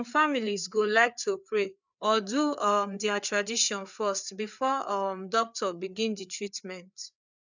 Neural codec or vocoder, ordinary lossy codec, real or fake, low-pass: codec, 16 kHz, 4 kbps, FreqCodec, larger model; none; fake; 7.2 kHz